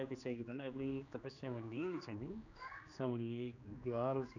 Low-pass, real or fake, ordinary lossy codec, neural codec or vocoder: 7.2 kHz; fake; none; codec, 16 kHz, 2 kbps, X-Codec, HuBERT features, trained on general audio